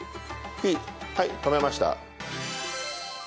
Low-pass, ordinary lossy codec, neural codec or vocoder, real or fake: none; none; none; real